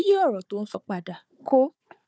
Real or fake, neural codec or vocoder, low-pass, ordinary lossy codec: fake; codec, 16 kHz, 4 kbps, FunCodec, trained on Chinese and English, 50 frames a second; none; none